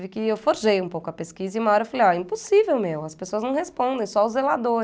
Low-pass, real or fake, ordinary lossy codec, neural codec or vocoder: none; real; none; none